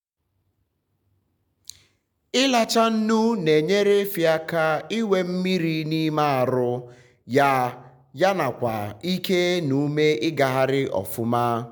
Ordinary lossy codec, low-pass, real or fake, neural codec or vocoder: none; none; real; none